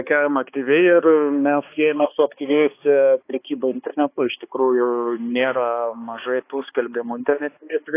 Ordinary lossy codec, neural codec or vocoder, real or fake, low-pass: AAC, 24 kbps; codec, 16 kHz, 2 kbps, X-Codec, HuBERT features, trained on balanced general audio; fake; 3.6 kHz